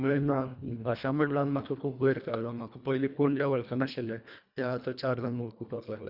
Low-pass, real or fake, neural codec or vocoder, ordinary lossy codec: 5.4 kHz; fake; codec, 24 kHz, 1.5 kbps, HILCodec; none